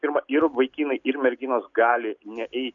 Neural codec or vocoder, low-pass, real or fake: none; 7.2 kHz; real